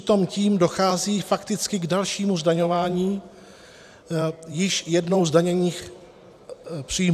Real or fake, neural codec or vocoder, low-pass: fake; vocoder, 44.1 kHz, 128 mel bands every 512 samples, BigVGAN v2; 14.4 kHz